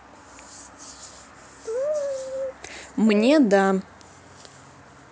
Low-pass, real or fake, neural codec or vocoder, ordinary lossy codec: none; real; none; none